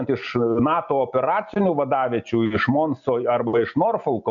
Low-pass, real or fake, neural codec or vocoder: 7.2 kHz; real; none